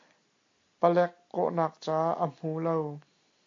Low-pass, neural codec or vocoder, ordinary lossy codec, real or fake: 7.2 kHz; none; AAC, 48 kbps; real